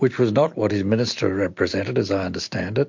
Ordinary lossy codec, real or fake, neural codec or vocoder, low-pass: MP3, 48 kbps; real; none; 7.2 kHz